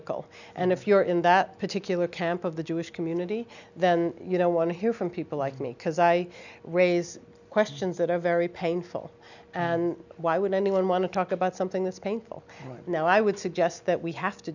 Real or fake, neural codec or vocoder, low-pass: real; none; 7.2 kHz